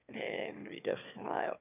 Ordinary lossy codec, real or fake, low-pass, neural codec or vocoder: none; fake; 3.6 kHz; autoencoder, 22.05 kHz, a latent of 192 numbers a frame, VITS, trained on one speaker